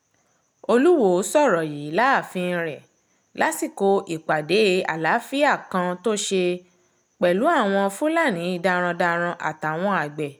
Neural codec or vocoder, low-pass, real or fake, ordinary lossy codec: none; none; real; none